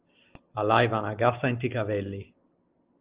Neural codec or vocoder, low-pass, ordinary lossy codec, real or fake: none; 3.6 kHz; Opus, 24 kbps; real